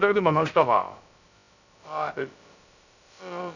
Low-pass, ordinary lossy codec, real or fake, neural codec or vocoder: 7.2 kHz; none; fake; codec, 16 kHz, about 1 kbps, DyCAST, with the encoder's durations